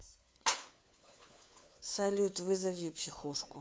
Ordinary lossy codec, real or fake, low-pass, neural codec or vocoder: none; fake; none; codec, 16 kHz, 4 kbps, FunCodec, trained on LibriTTS, 50 frames a second